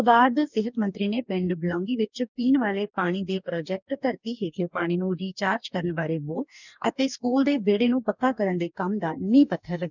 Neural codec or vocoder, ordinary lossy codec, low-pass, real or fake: codec, 44.1 kHz, 2.6 kbps, DAC; none; 7.2 kHz; fake